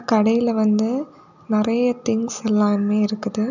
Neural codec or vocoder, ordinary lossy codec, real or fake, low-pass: none; none; real; 7.2 kHz